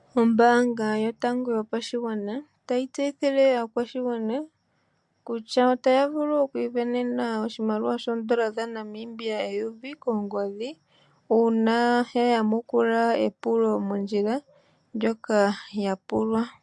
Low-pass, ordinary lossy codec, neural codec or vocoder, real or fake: 10.8 kHz; MP3, 64 kbps; none; real